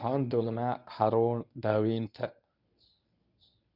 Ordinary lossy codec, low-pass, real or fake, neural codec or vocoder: none; 5.4 kHz; fake; codec, 24 kHz, 0.9 kbps, WavTokenizer, medium speech release version 1